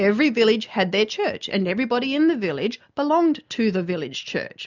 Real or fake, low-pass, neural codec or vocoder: real; 7.2 kHz; none